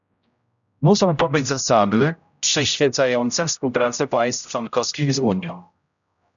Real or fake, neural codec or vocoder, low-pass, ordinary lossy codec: fake; codec, 16 kHz, 0.5 kbps, X-Codec, HuBERT features, trained on general audio; 7.2 kHz; MP3, 96 kbps